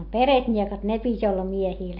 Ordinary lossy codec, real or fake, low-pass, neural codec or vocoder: AAC, 48 kbps; real; 5.4 kHz; none